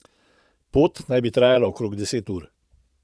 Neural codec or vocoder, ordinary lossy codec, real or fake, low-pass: vocoder, 22.05 kHz, 80 mel bands, Vocos; none; fake; none